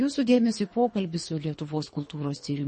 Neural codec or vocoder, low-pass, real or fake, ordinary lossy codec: codec, 24 kHz, 3 kbps, HILCodec; 10.8 kHz; fake; MP3, 32 kbps